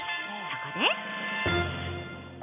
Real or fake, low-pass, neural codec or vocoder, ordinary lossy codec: real; 3.6 kHz; none; none